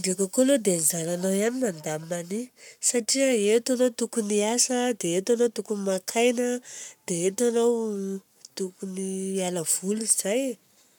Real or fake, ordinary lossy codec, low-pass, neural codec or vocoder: fake; none; 19.8 kHz; codec, 44.1 kHz, 7.8 kbps, Pupu-Codec